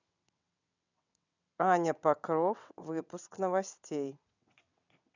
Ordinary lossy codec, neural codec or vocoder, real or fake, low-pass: none; vocoder, 22.05 kHz, 80 mel bands, WaveNeXt; fake; 7.2 kHz